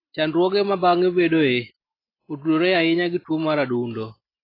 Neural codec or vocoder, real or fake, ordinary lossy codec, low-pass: none; real; AAC, 24 kbps; 5.4 kHz